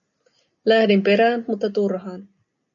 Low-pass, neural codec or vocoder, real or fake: 7.2 kHz; none; real